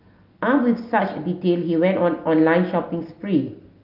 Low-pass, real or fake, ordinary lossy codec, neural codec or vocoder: 5.4 kHz; real; Opus, 32 kbps; none